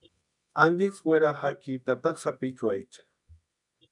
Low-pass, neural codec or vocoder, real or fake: 10.8 kHz; codec, 24 kHz, 0.9 kbps, WavTokenizer, medium music audio release; fake